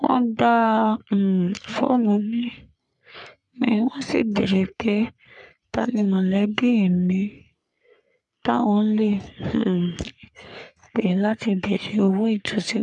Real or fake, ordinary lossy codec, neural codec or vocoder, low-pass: fake; none; codec, 44.1 kHz, 3.4 kbps, Pupu-Codec; 10.8 kHz